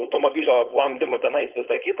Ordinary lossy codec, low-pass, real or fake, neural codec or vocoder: Opus, 32 kbps; 3.6 kHz; fake; codec, 16 kHz, 4.8 kbps, FACodec